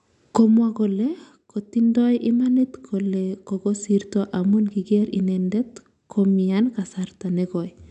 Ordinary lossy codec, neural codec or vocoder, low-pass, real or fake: none; none; 10.8 kHz; real